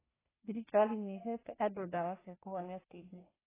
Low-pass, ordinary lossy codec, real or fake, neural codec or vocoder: 3.6 kHz; AAC, 16 kbps; fake; codec, 44.1 kHz, 1.7 kbps, Pupu-Codec